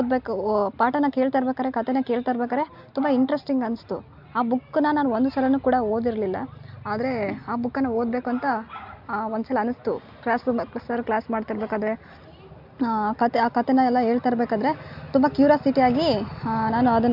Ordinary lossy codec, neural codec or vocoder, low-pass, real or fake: MP3, 48 kbps; none; 5.4 kHz; real